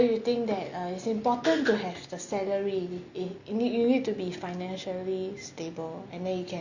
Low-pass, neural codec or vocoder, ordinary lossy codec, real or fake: 7.2 kHz; none; Opus, 64 kbps; real